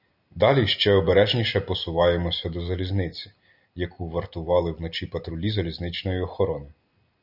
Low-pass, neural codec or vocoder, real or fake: 5.4 kHz; none; real